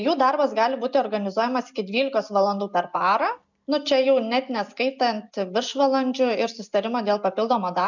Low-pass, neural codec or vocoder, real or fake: 7.2 kHz; none; real